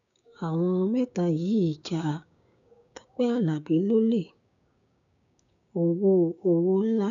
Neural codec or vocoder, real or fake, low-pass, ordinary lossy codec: codec, 16 kHz, 6 kbps, DAC; fake; 7.2 kHz; none